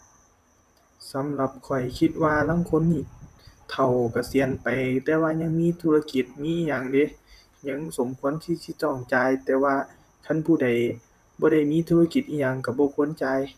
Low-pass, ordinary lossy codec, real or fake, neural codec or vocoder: 14.4 kHz; none; fake; vocoder, 44.1 kHz, 128 mel bands, Pupu-Vocoder